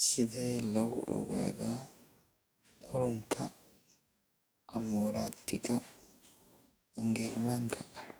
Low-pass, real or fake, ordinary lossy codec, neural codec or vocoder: none; fake; none; codec, 44.1 kHz, 2.6 kbps, DAC